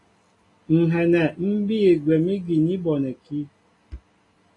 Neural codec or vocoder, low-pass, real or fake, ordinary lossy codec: none; 10.8 kHz; real; AAC, 32 kbps